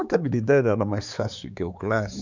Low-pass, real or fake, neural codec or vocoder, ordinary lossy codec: 7.2 kHz; fake; codec, 16 kHz, 4 kbps, X-Codec, HuBERT features, trained on balanced general audio; none